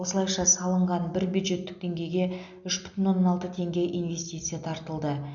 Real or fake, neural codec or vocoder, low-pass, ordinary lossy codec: real; none; 7.2 kHz; none